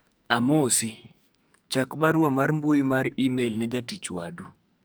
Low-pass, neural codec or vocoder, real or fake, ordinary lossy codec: none; codec, 44.1 kHz, 2.6 kbps, SNAC; fake; none